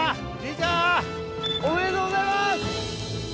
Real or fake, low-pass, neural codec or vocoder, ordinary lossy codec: real; none; none; none